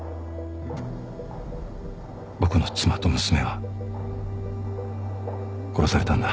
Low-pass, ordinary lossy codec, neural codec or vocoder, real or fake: none; none; none; real